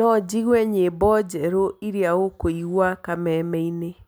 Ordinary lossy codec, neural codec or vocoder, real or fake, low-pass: none; none; real; none